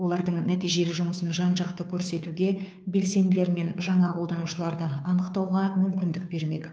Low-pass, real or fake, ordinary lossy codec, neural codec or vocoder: none; fake; none; codec, 16 kHz, 2 kbps, FunCodec, trained on Chinese and English, 25 frames a second